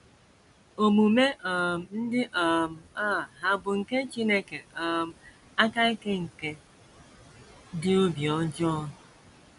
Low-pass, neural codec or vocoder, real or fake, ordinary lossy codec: 10.8 kHz; none; real; none